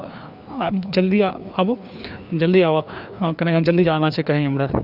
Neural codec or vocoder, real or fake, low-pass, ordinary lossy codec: codec, 16 kHz, 2 kbps, FreqCodec, larger model; fake; 5.4 kHz; none